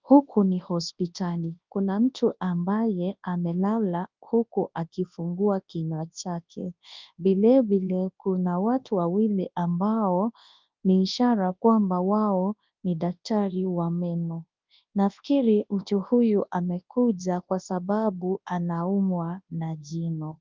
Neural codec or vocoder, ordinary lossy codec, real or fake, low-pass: codec, 24 kHz, 0.9 kbps, WavTokenizer, large speech release; Opus, 24 kbps; fake; 7.2 kHz